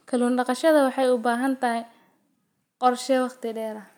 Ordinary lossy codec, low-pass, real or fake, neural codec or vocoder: none; none; real; none